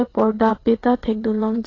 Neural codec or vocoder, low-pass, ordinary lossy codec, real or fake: vocoder, 22.05 kHz, 80 mel bands, WaveNeXt; 7.2 kHz; AAC, 32 kbps; fake